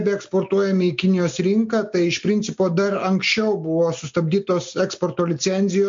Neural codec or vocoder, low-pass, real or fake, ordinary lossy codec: none; 7.2 kHz; real; MP3, 48 kbps